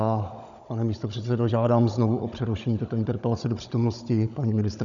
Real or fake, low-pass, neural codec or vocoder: fake; 7.2 kHz; codec, 16 kHz, 16 kbps, FunCodec, trained on Chinese and English, 50 frames a second